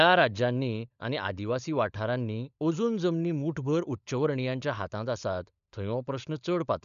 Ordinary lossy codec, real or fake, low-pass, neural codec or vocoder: none; fake; 7.2 kHz; codec, 16 kHz, 16 kbps, FunCodec, trained on LibriTTS, 50 frames a second